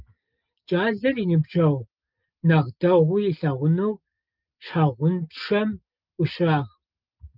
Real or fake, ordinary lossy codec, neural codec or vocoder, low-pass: real; Opus, 24 kbps; none; 5.4 kHz